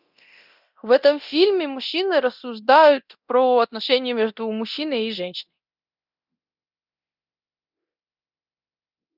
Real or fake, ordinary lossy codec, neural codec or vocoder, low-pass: fake; Opus, 64 kbps; codec, 24 kHz, 0.9 kbps, DualCodec; 5.4 kHz